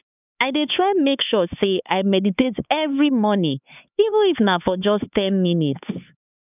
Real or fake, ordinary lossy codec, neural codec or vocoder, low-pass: fake; none; codec, 16 kHz, 4 kbps, X-Codec, HuBERT features, trained on LibriSpeech; 3.6 kHz